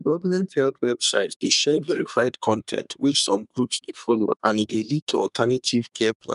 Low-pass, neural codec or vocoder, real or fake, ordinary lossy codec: 10.8 kHz; codec, 24 kHz, 1 kbps, SNAC; fake; none